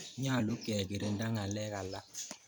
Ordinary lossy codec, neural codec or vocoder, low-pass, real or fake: none; vocoder, 44.1 kHz, 128 mel bands every 256 samples, BigVGAN v2; none; fake